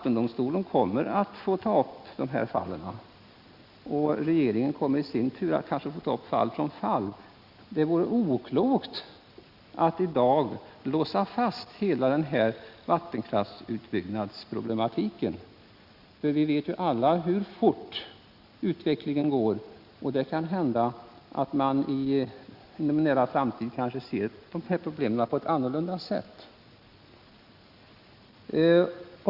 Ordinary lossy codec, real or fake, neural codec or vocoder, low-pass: Opus, 64 kbps; real; none; 5.4 kHz